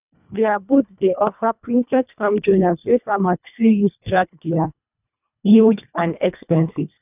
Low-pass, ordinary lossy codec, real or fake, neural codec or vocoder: 3.6 kHz; none; fake; codec, 24 kHz, 1.5 kbps, HILCodec